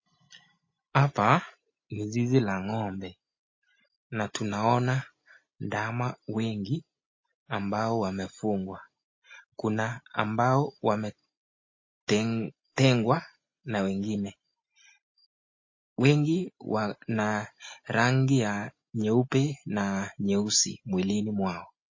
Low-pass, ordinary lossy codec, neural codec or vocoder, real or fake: 7.2 kHz; MP3, 32 kbps; none; real